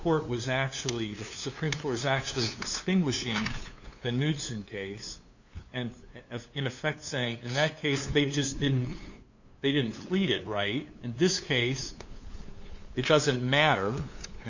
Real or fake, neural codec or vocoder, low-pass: fake; codec, 16 kHz, 2 kbps, FunCodec, trained on LibriTTS, 25 frames a second; 7.2 kHz